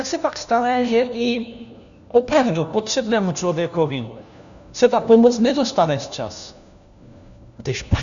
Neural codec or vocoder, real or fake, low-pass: codec, 16 kHz, 1 kbps, FunCodec, trained on LibriTTS, 50 frames a second; fake; 7.2 kHz